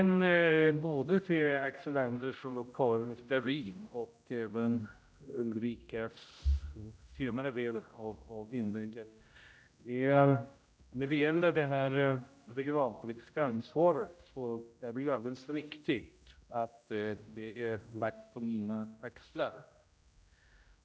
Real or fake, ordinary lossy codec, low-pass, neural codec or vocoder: fake; none; none; codec, 16 kHz, 0.5 kbps, X-Codec, HuBERT features, trained on general audio